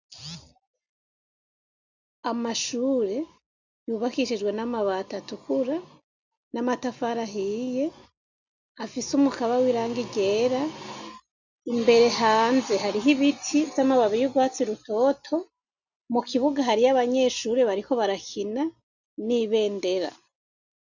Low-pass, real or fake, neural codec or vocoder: 7.2 kHz; real; none